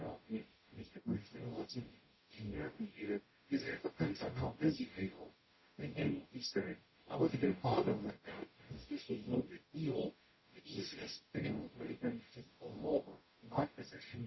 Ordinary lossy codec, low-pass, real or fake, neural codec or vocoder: MP3, 24 kbps; 7.2 kHz; fake; codec, 44.1 kHz, 0.9 kbps, DAC